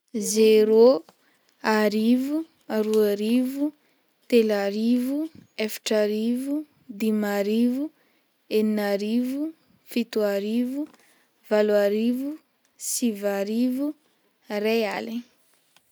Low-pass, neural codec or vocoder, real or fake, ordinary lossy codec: none; none; real; none